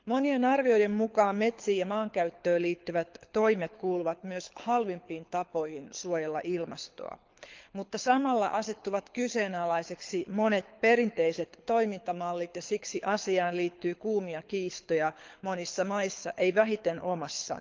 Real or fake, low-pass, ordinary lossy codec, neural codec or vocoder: fake; 7.2 kHz; Opus, 24 kbps; codec, 24 kHz, 6 kbps, HILCodec